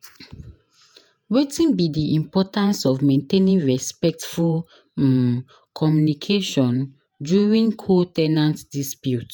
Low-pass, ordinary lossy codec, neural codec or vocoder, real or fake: none; none; vocoder, 48 kHz, 128 mel bands, Vocos; fake